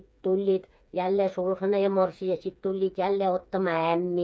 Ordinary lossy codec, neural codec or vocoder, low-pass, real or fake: none; codec, 16 kHz, 4 kbps, FreqCodec, smaller model; none; fake